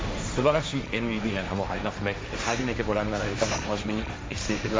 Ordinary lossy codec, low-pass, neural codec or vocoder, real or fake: none; none; codec, 16 kHz, 1.1 kbps, Voila-Tokenizer; fake